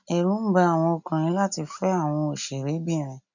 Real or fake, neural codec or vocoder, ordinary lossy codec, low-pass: real; none; none; 7.2 kHz